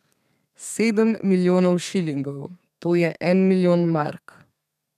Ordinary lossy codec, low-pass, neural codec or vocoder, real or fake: none; 14.4 kHz; codec, 32 kHz, 1.9 kbps, SNAC; fake